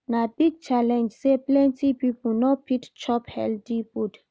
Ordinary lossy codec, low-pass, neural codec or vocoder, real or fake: none; none; none; real